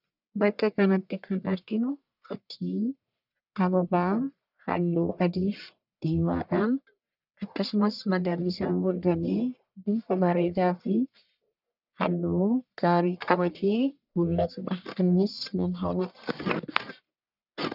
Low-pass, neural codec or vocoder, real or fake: 5.4 kHz; codec, 44.1 kHz, 1.7 kbps, Pupu-Codec; fake